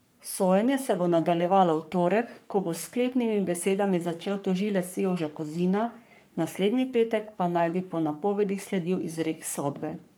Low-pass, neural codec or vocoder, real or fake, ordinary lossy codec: none; codec, 44.1 kHz, 3.4 kbps, Pupu-Codec; fake; none